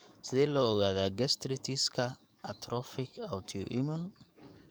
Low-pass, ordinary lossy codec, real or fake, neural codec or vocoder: none; none; fake; codec, 44.1 kHz, 7.8 kbps, DAC